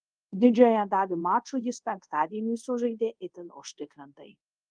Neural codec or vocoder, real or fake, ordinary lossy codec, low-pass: codec, 24 kHz, 0.5 kbps, DualCodec; fake; Opus, 32 kbps; 9.9 kHz